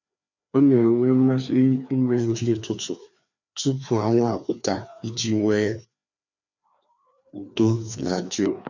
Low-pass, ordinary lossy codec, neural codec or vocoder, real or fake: 7.2 kHz; none; codec, 16 kHz, 2 kbps, FreqCodec, larger model; fake